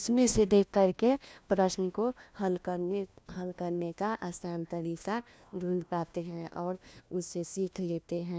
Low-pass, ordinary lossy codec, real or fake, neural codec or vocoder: none; none; fake; codec, 16 kHz, 1 kbps, FunCodec, trained on LibriTTS, 50 frames a second